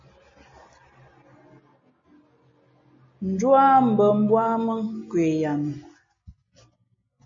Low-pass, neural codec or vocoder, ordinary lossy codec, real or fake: 7.2 kHz; none; MP3, 32 kbps; real